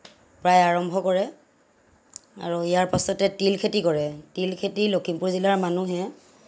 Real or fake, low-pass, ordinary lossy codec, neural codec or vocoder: real; none; none; none